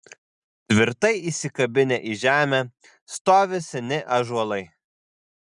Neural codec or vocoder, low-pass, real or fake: none; 10.8 kHz; real